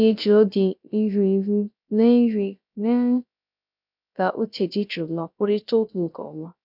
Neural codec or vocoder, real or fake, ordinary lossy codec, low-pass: codec, 16 kHz, 0.3 kbps, FocalCodec; fake; none; 5.4 kHz